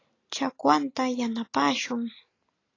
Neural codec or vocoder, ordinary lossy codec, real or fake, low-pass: none; AAC, 32 kbps; real; 7.2 kHz